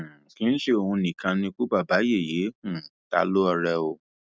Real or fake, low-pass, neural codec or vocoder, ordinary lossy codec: real; none; none; none